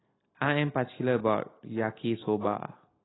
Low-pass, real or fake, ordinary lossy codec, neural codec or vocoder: 7.2 kHz; real; AAC, 16 kbps; none